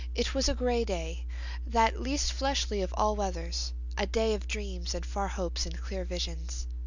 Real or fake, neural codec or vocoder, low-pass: real; none; 7.2 kHz